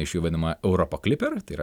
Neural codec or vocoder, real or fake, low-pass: none; real; 19.8 kHz